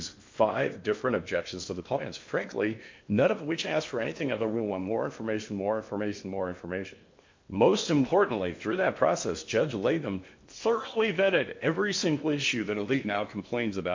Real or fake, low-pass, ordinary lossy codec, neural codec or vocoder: fake; 7.2 kHz; MP3, 48 kbps; codec, 16 kHz in and 24 kHz out, 0.8 kbps, FocalCodec, streaming, 65536 codes